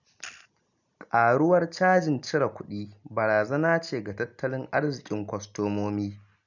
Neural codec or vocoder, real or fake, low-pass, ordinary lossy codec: none; real; 7.2 kHz; none